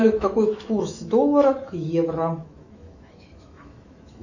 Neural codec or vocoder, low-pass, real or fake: autoencoder, 48 kHz, 128 numbers a frame, DAC-VAE, trained on Japanese speech; 7.2 kHz; fake